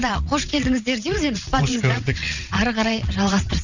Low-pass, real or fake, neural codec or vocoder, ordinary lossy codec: 7.2 kHz; fake; vocoder, 22.05 kHz, 80 mel bands, WaveNeXt; none